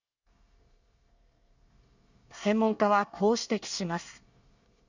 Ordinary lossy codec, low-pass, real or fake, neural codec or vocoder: none; 7.2 kHz; fake; codec, 24 kHz, 1 kbps, SNAC